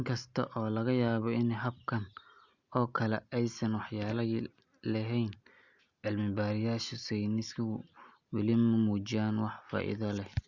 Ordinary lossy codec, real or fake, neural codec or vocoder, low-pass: Opus, 64 kbps; real; none; 7.2 kHz